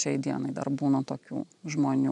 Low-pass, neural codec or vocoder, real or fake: 10.8 kHz; none; real